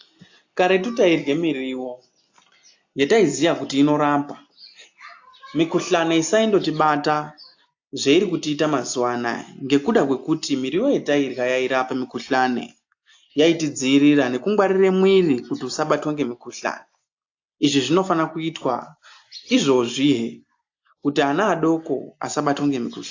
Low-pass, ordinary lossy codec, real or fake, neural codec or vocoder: 7.2 kHz; AAC, 48 kbps; real; none